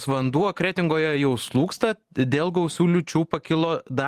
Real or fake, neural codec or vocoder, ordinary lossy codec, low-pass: fake; vocoder, 44.1 kHz, 128 mel bands every 512 samples, BigVGAN v2; Opus, 24 kbps; 14.4 kHz